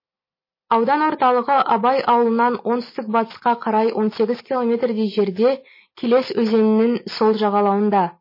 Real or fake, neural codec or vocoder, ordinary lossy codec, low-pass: real; none; MP3, 24 kbps; 5.4 kHz